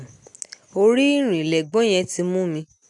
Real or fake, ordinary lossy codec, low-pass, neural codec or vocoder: real; none; 10.8 kHz; none